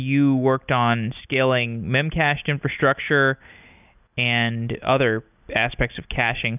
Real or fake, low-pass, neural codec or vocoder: real; 3.6 kHz; none